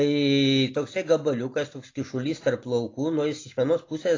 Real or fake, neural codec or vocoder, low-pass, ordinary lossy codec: real; none; 7.2 kHz; AAC, 32 kbps